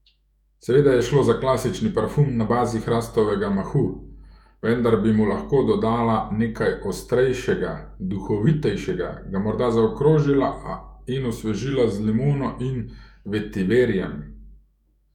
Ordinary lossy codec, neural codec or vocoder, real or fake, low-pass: none; autoencoder, 48 kHz, 128 numbers a frame, DAC-VAE, trained on Japanese speech; fake; 19.8 kHz